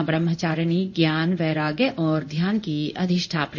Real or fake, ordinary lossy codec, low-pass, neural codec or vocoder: fake; none; 7.2 kHz; codec, 16 kHz in and 24 kHz out, 1 kbps, XY-Tokenizer